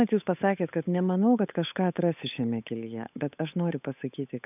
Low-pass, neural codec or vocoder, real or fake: 3.6 kHz; codec, 16 kHz, 8 kbps, FunCodec, trained on Chinese and English, 25 frames a second; fake